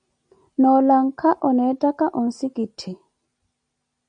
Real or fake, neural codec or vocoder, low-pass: real; none; 9.9 kHz